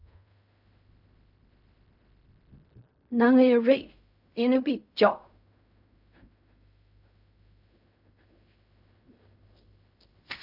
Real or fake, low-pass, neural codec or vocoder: fake; 5.4 kHz; codec, 16 kHz in and 24 kHz out, 0.4 kbps, LongCat-Audio-Codec, fine tuned four codebook decoder